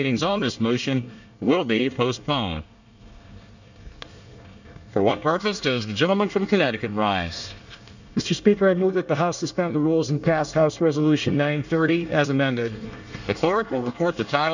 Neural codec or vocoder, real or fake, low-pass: codec, 24 kHz, 1 kbps, SNAC; fake; 7.2 kHz